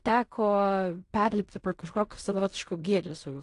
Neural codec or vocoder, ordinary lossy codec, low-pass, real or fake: codec, 16 kHz in and 24 kHz out, 0.4 kbps, LongCat-Audio-Codec, fine tuned four codebook decoder; AAC, 48 kbps; 10.8 kHz; fake